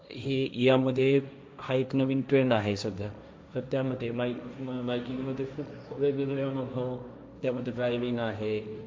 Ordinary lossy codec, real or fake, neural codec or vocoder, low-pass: none; fake; codec, 16 kHz, 1.1 kbps, Voila-Tokenizer; none